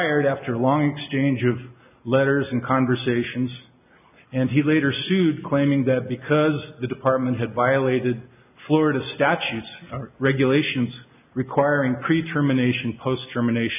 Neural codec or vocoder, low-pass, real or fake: none; 3.6 kHz; real